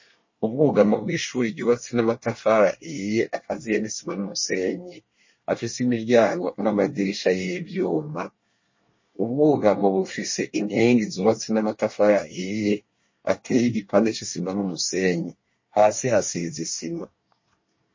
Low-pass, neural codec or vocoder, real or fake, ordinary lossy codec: 7.2 kHz; codec, 24 kHz, 1 kbps, SNAC; fake; MP3, 32 kbps